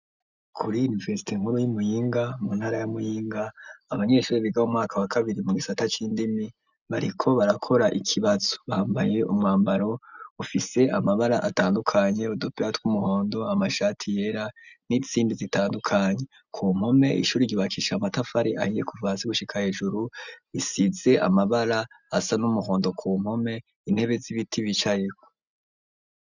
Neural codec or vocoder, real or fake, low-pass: none; real; 7.2 kHz